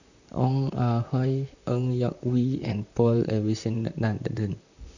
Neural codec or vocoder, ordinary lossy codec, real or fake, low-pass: vocoder, 44.1 kHz, 128 mel bands, Pupu-Vocoder; none; fake; 7.2 kHz